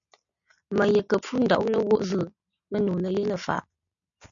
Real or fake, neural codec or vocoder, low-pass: real; none; 7.2 kHz